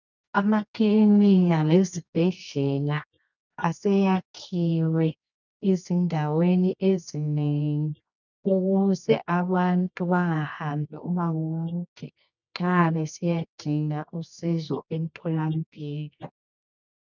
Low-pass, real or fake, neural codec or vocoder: 7.2 kHz; fake; codec, 24 kHz, 0.9 kbps, WavTokenizer, medium music audio release